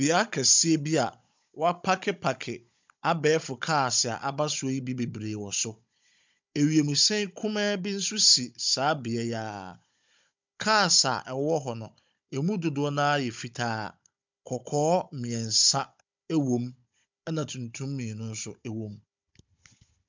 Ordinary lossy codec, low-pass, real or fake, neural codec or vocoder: MP3, 64 kbps; 7.2 kHz; fake; codec, 16 kHz, 16 kbps, FunCodec, trained on Chinese and English, 50 frames a second